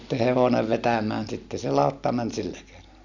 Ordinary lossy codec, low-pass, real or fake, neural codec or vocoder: none; 7.2 kHz; real; none